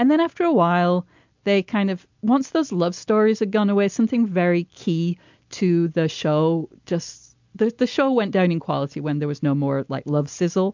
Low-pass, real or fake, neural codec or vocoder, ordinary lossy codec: 7.2 kHz; real; none; MP3, 64 kbps